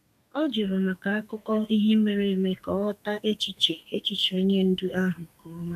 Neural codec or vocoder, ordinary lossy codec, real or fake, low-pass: codec, 32 kHz, 1.9 kbps, SNAC; none; fake; 14.4 kHz